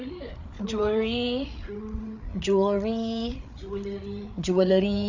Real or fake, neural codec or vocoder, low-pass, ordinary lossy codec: fake; codec, 16 kHz, 8 kbps, FreqCodec, larger model; 7.2 kHz; none